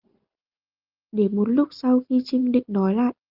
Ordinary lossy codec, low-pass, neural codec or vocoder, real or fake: Opus, 24 kbps; 5.4 kHz; none; real